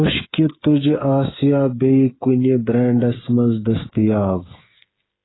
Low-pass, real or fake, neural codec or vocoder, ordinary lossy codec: 7.2 kHz; fake; codec, 16 kHz, 8 kbps, FreqCodec, smaller model; AAC, 16 kbps